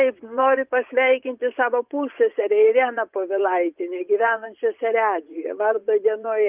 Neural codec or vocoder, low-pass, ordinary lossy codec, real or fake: vocoder, 24 kHz, 100 mel bands, Vocos; 3.6 kHz; Opus, 24 kbps; fake